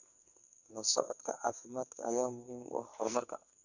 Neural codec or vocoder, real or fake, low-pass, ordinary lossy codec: codec, 44.1 kHz, 2.6 kbps, SNAC; fake; 7.2 kHz; none